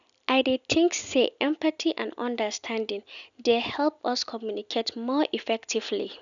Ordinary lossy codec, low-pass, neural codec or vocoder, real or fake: none; 7.2 kHz; none; real